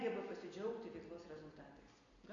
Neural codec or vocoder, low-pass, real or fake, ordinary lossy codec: none; 7.2 kHz; real; AAC, 64 kbps